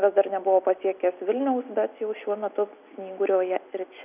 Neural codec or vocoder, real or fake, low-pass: none; real; 3.6 kHz